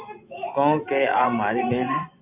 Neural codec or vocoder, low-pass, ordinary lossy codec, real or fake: vocoder, 24 kHz, 100 mel bands, Vocos; 3.6 kHz; AAC, 32 kbps; fake